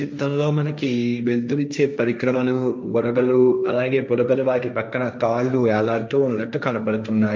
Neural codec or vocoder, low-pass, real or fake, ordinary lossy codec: codec, 16 kHz, 1.1 kbps, Voila-Tokenizer; none; fake; none